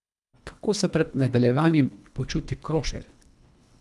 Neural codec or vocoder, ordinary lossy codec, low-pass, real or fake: codec, 24 kHz, 1.5 kbps, HILCodec; none; none; fake